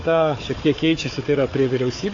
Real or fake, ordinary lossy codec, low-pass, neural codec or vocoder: fake; AAC, 32 kbps; 7.2 kHz; codec, 16 kHz, 4 kbps, FunCodec, trained on Chinese and English, 50 frames a second